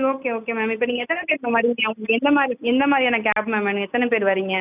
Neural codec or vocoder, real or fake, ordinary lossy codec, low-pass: none; real; none; 3.6 kHz